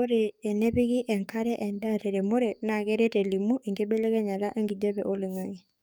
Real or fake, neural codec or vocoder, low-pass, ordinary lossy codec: fake; codec, 44.1 kHz, 7.8 kbps, DAC; none; none